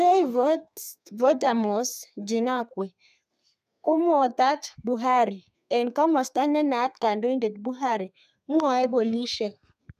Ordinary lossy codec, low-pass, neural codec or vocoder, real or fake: none; 14.4 kHz; codec, 32 kHz, 1.9 kbps, SNAC; fake